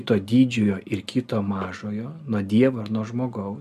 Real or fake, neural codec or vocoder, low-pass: real; none; 14.4 kHz